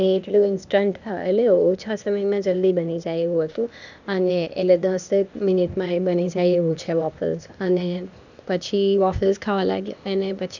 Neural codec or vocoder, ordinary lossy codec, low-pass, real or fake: codec, 16 kHz, 0.8 kbps, ZipCodec; none; 7.2 kHz; fake